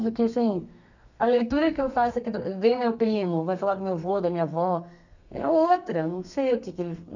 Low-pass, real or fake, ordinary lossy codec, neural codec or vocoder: 7.2 kHz; fake; none; codec, 32 kHz, 1.9 kbps, SNAC